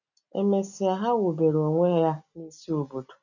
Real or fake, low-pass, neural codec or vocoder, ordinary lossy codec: real; 7.2 kHz; none; none